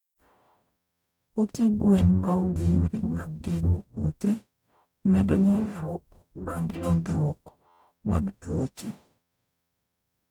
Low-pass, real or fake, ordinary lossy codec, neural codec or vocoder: 19.8 kHz; fake; none; codec, 44.1 kHz, 0.9 kbps, DAC